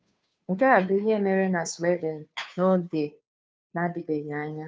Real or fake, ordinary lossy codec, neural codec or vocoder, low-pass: fake; none; codec, 16 kHz, 2 kbps, FunCodec, trained on Chinese and English, 25 frames a second; none